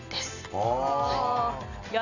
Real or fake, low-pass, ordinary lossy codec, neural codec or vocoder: real; 7.2 kHz; none; none